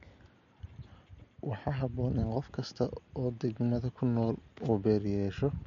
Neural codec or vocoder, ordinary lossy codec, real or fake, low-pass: none; MP3, 48 kbps; real; 7.2 kHz